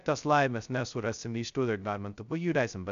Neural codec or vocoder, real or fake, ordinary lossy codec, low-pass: codec, 16 kHz, 0.2 kbps, FocalCodec; fake; AAC, 96 kbps; 7.2 kHz